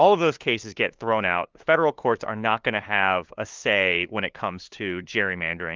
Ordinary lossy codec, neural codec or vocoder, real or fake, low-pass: Opus, 24 kbps; codec, 16 kHz, 2 kbps, FunCodec, trained on LibriTTS, 25 frames a second; fake; 7.2 kHz